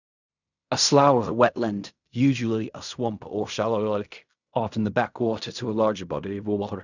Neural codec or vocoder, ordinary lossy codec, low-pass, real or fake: codec, 16 kHz in and 24 kHz out, 0.4 kbps, LongCat-Audio-Codec, fine tuned four codebook decoder; none; 7.2 kHz; fake